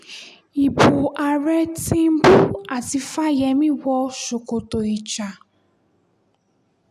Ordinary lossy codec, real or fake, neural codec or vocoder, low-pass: none; real; none; 14.4 kHz